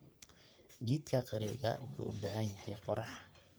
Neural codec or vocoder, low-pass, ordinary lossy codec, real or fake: codec, 44.1 kHz, 3.4 kbps, Pupu-Codec; none; none; fake